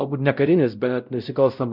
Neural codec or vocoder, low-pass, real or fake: codec, 16 kHz, 0.5 kbps, X-Codec, WavLM features, trained on Multilingual LibriSpeech; 5.4 kHz; fake